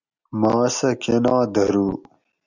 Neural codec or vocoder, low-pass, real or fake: none; 7.2 kHz; real